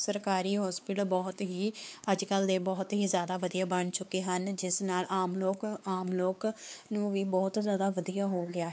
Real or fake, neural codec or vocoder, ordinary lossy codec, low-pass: fake; codec, 16 kHz, 4 kbps, X-Codec, WavLM features, trained on Multilingual LibriSpeech; none; none